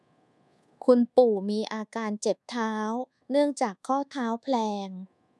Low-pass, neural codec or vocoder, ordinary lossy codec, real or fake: none; codec, 24 kHz, 1.2 kbps, DualCodec; none; fake